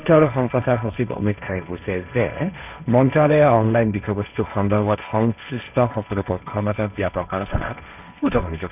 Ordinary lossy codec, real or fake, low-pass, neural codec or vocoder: none; fake; 3.6 kHz; codec, 16 kHz, 1.1 kbps, Voila-Tokenizer